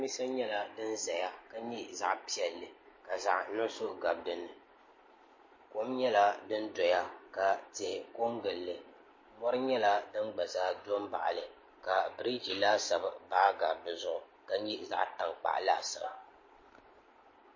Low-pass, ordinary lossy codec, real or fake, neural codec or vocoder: 7.2 kHz; MP3, 32 kbps; real; none